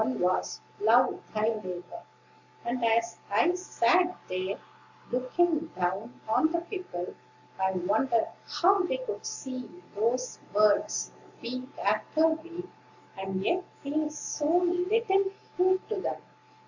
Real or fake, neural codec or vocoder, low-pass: real; none; 7.2 kHz